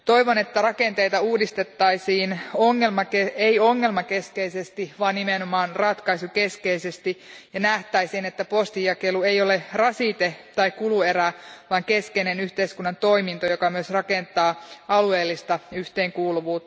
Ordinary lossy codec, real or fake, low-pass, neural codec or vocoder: none; real; none; none